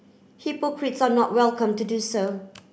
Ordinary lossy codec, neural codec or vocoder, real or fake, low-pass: none; none; real; none